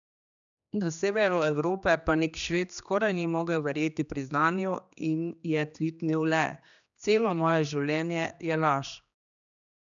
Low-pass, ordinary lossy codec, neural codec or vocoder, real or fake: 7.2 kHz; none; codec, 16 kHz, 2 kbps, X-Codec, HuBERT features, trained on general audio; fake